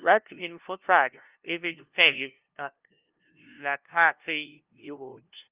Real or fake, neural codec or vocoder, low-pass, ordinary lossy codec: fake; codec, 16 kHz, 0.5 kbps, FunCodec, trained on LibriTTS, 25 frames a second; 3.6 kHz; Opus, 24 kbps